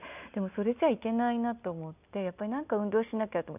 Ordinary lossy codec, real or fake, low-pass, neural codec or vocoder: none; real; 3.6 kHz; none